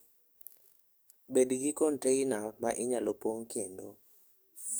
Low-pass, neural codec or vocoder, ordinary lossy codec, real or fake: none; codec, 44.1 kHz, 7.8 kbps, DAC; none; fake